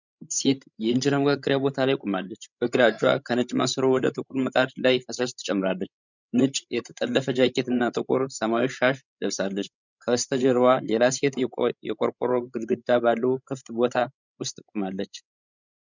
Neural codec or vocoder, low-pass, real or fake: codec, 16 kHz, 16 kbps, FreqCodec, larger model; 7.2 kHz; fake